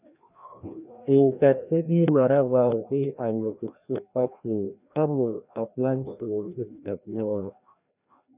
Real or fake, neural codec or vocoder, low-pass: fake; codec, 16 kHz, 1 kbps, FreqCodec, larger model; 3.6 kHz